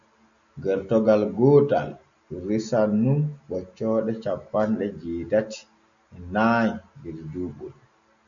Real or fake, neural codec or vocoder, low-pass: real; none; 7.2 kHz